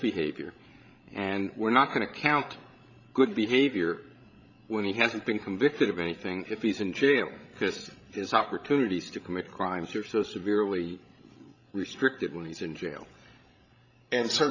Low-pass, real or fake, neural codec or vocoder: 7.2 kHz; fake; codec, 16 kHz, 16 kbps, FreqCodec, larger model